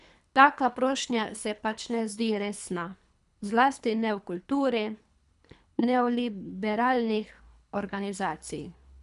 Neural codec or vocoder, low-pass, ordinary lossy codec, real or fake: codec, 24 kHz, 3 kbps, HILCodec; 10.8 kHz; none; fake